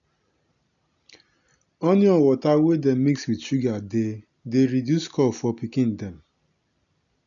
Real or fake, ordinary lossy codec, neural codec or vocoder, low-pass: real; none; none; 7.2 kHz